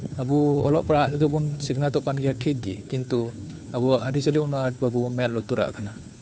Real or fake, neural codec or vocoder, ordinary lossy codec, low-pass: fake; codec, 16 kHz, 2 kbps, FunCodec, trained on Chinese and English, 25 frames a second; none; none